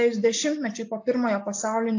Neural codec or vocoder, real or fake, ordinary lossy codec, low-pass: none; real; AAC, 48 kbps; 7.2 kHz